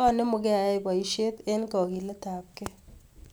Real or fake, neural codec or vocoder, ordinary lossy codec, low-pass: real; none; none; none